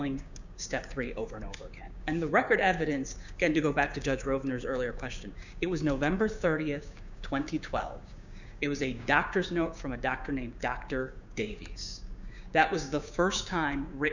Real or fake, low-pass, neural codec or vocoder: fake; 7.2 kHz; codec, 16 kHz, 6 kbps, DAC